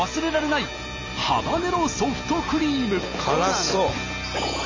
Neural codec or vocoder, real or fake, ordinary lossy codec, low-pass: none; real; MP3, 48 kbps; 7.2 kHz